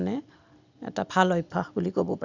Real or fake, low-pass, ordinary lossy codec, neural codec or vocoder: real; 7.2 kHz; none; none